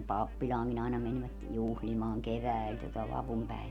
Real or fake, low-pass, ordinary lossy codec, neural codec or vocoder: real; 19.8 kHz; none; none